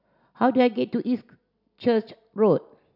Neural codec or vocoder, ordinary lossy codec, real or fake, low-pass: none; none; real; 5.4 kHz